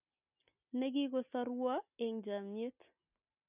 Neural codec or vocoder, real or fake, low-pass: none; real; 3.6 kHz